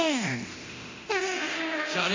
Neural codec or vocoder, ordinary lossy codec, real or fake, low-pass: codec, 24 kHz, 0.9 kbps, DualCodec; MP3, 48 kbps; fake; 7.2 kHz